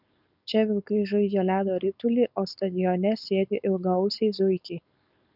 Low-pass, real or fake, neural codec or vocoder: 5.4 kHz; fake; codec, 16 kHz, 4.8 kbps, FACodec